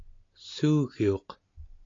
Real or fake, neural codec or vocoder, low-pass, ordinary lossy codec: real; none; 7.2 kHz; AAC, 32 kbps